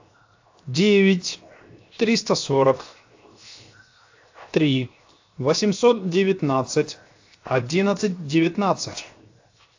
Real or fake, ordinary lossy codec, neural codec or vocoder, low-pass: fake; AAC, 48 kbps; codec, 16 kHz, 0.7 kbps, FocalCodec; 7.2 kHz